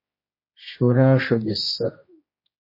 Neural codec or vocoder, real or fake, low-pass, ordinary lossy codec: codec, 16 kHz, 1 kbps, X-Codec, HuBERT features, trained on general audio; fake; 5.4 kHz; MP3, 24 kbps